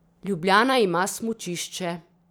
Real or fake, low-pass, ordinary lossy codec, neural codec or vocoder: fake; none; none; vocoder, 44.1 kHz, 128 mel bands every 512 samples, BigVGAN v2